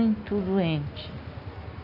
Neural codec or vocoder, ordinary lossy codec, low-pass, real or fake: none; none; 5.4 kHz; real